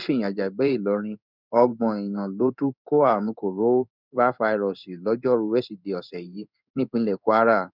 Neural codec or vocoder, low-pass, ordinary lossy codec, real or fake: codec, 16 kHz in and 24 kHz out, 1 kbps, XY-Tokenizer; 5.4 kHz; none; fake